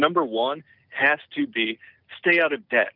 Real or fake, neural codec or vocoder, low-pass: real; none; 5.4 kHz